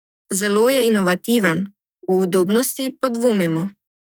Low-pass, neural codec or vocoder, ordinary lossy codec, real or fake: none; codec, 44.1 kHz, 2.6 kbps, SNAC; none; fake